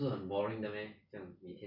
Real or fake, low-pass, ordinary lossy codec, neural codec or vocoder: real; 5.4 kHz; none; none